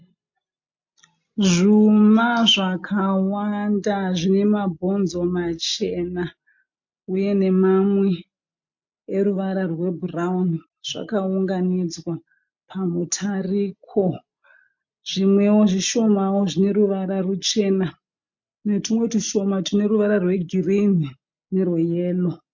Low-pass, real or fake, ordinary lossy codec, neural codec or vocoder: 7.2 kHz; real; MP3, 48 kbps; none